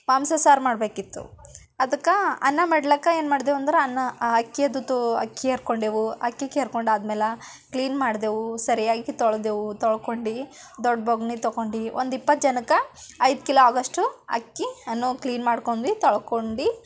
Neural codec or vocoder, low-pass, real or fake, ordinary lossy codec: none; none; real; none